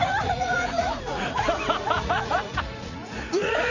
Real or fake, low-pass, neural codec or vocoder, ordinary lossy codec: fake; 7.2 kHz; vocoder, 44.1 kHz, 80 mel bands, Vocos; none